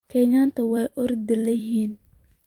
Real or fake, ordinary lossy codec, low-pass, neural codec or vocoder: fake; Opus, 24 kbps; 19.8 kHz; vocoder, 44.1 kHz, 128 mel bands every 256 samples, BigVGAN v2